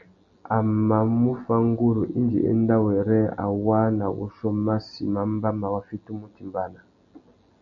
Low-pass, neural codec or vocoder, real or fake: 7.2 kHz; none; real